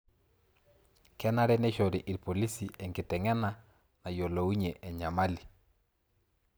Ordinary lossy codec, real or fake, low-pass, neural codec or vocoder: none; real; none; none